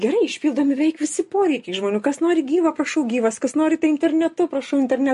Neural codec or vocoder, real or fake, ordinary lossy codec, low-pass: none; real; MP3, 48 kbps; 14.4 kHz